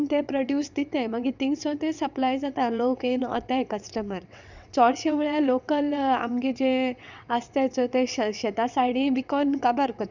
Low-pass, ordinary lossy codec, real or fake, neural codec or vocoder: 7.2 kHz; none; fake; vocoder, 22.05 kHz, 80 mel bands, WaveNeXt